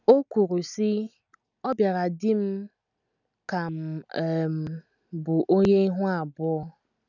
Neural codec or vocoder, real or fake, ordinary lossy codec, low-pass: none; real; none; 7.2 kHz